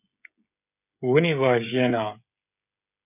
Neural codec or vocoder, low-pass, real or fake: codec, 16 kHz, 16 kbps, FreqCodec, smaller model; 3.6 kHz; fake